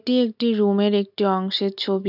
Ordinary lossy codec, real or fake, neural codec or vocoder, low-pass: none; real; none; 5.4 kHz